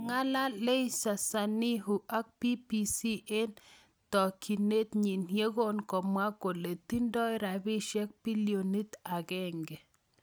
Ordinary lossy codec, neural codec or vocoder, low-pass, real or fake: none; none; none; real